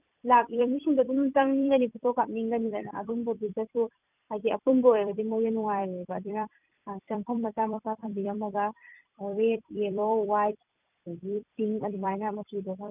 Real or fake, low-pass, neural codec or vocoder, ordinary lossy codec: fake; 3.6 kHz; vocoder, 44.1 kHz, 128 mel bands every 256 samples, BigVGAN v2; none